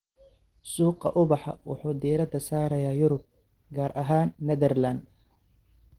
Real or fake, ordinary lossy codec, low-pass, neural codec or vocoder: real; Opus, 16 kbps; 19.8 kHz; none